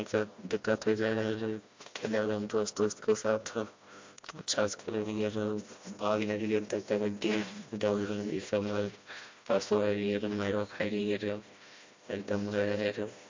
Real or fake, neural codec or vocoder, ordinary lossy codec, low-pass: fake; codec, 16 kHz, 1 kbps, FreqCodec, smaller model; MP3, 48 kbps; 7.2 kHz